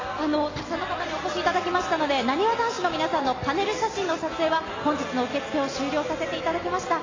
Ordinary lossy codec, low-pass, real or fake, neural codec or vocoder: AAC, 32 kbps; 7.2 kHz; real; none